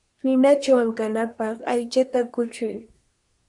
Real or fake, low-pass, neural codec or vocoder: fake; 10.8 kHz; codec, 24 kHz, 1 kbps, SNAC